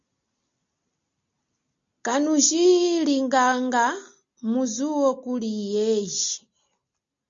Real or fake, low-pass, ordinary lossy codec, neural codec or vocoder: real; 7.2 kHz; MP3, 48 kbps; none